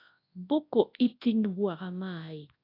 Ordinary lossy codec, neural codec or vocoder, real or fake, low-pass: AAC, 32 kbps; codec, 24 kHz, 0.9 kbps, WavTokenizer, large speech release; fake; 5.4 kHz